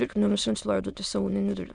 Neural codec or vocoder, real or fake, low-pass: autoencoder, 22.05 kHz, a latent of 192 numbers a frame, VITS, trained on many speakers; fake; 9.9 kHz